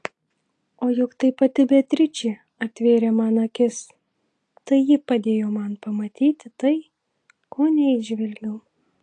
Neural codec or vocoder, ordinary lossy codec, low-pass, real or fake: none; AAC, 48 kbps; 9.9 kHz; real